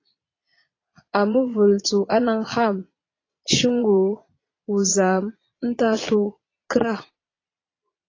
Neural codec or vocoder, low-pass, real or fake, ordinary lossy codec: vocoder, 22.05 kHz, 80 mel bands, Vocos; 7.2 kHz; fake; AAC, 32 kbps